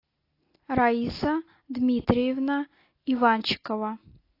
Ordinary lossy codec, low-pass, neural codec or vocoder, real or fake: AAC, 32 kbps; 5.4 kHz; none; real